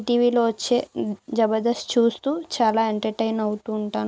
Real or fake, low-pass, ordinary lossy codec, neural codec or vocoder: real; none; none; none